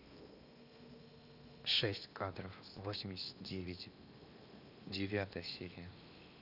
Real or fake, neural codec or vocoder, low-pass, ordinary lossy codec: fake; codec, 16 kHz in and 24 kHz out, 0.8 kbps, FocalCodec, streaming, 65536 codes; 5.4 kHz; none